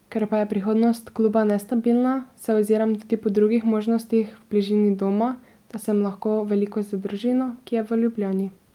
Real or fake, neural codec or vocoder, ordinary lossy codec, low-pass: real; none; Opus, 32 kbps; 19.8 kHz